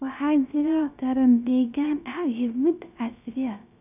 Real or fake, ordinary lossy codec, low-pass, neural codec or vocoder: fake; none; 3.6 kHz; codec, 16 kHz, 0.3 kbps, FocalCodec